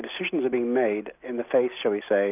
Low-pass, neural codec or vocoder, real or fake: 3.6 kHz; none; real